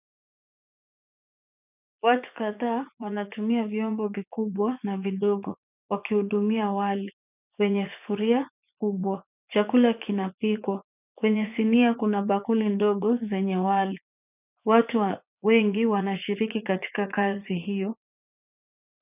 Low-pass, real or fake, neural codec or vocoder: 3.6 kHz; fake; vocoder, 44.1 kHz, 80 mel bands, Vocos